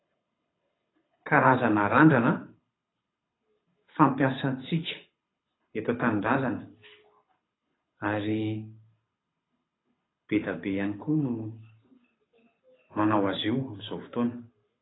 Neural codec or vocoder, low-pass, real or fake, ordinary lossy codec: codec, 24 kHz, 6 kbps, HILCodec; 7.2 kHz; fake; AAC, 16 kbps